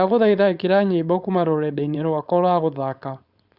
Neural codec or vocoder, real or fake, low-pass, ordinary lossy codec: codec, 16 kHz, 4.8 kbps, FACodec; fake; 5.4 kHz; Opus, 64 kbps